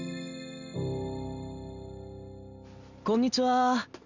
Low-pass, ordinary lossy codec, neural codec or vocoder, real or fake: 7.2 kHz; none; none; real